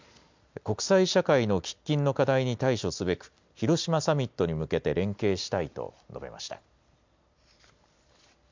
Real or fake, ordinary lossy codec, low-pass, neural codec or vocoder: real; MP3, 64 kbps; 7.2 kHz; none